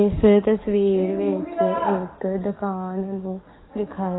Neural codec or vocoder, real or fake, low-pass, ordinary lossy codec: codec, 44.1 kHz, 7.8 kbps, DAC; fake; 7.2 kHz; AAC, 16 kbps